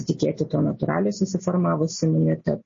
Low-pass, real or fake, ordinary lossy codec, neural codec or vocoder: 7.2 kHz; real; MP3, 32 kbps; none